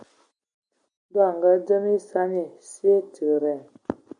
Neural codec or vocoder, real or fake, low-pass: none; real; 9.9 kHz